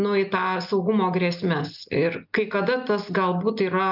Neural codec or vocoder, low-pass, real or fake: none; 5.4 kHz; real